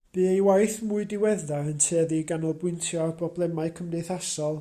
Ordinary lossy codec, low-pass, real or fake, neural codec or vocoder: Opus, 64 kbps; 14.4 kHz; real; none